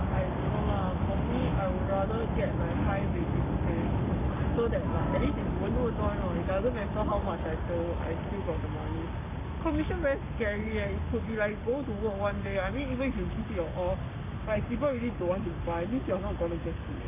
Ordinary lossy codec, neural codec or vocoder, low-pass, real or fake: none; codec, 44.1 kHz, 7.8 kbps, Pupu-Codec; 3.6 kHz; fake